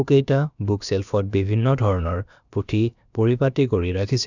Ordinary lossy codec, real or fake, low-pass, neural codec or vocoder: none; fake; 7.2 kHz; codec, 16 kHz, about 1 kbps, DyCAST, with the encoder's durations